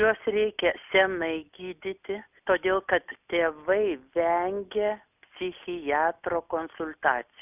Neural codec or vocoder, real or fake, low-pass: none; real; 3.6 kHz